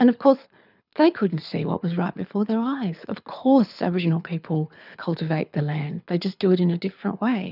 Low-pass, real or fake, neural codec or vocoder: 5.4 kHz; fake; codec, 24 kHz, 6 kbps, HILCodec